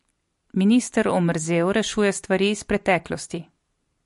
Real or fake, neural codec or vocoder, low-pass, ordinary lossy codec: fake; vocoder, 44.1 kHz, 128 mel bands every 256 samples, BigVGAN v2; 14.4 kHz; MP3, 48 kbps